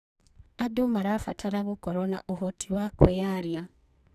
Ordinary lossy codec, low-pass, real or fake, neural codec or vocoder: none; 14.4 kHz; fake; codec, 44.1 kHz, 2.6 kbps, SNAC